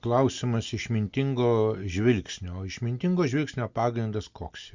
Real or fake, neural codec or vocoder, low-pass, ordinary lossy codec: real; none; 7.2 kHz; Opus, 64 kbps